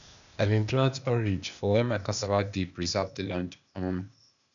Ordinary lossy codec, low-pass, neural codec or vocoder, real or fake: none; 7.2 kHz; codec, 16 kHz, 0.8 kbps, ZipCodec; fake